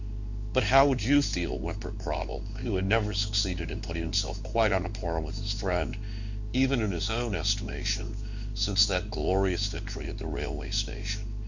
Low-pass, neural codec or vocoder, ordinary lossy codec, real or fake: 7.2 kHz; codec, 16 kHz in and 24 kHz out, 1 kbps, XY-Tokenizer; Opus, 64 kbps; fake